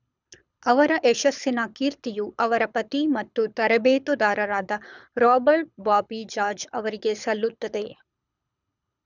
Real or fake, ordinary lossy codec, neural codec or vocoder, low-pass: fake; none; codec, 24 kHz, 6 kbps, HILCodec; 7.2 kHz